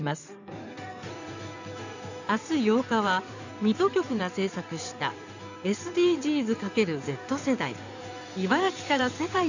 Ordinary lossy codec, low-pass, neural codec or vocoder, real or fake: none; 7.2 kHz; codec, 16 kHz in and 24 kHz out, 2.2 kbps, FireRedTTS-2 codec; fake